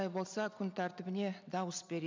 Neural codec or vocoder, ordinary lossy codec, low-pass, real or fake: none; MP3, 64 kbps; 7.2 kHz; real